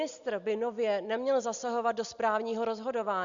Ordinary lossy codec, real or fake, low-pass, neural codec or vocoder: Opus, 64 kbps; real; 7.2 kHz; none